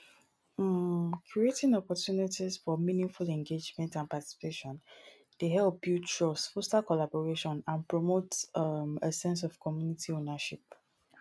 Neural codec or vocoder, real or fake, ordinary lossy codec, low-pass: none; real; none; none